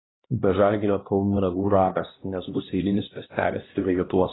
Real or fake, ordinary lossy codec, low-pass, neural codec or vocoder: fake; AAC, 16 kbps; 7.2 kHz; codec, 16 kHz, 1 kbps, X-Codec, HuBERT features, trained on LibriSpeech